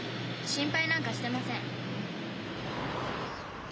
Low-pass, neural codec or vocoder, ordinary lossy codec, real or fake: none; none; none; real